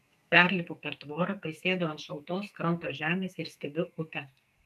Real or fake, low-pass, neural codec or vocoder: fake; 14.4 kHz; codec, 44.1 kHz, 2.6 kbps, SNAC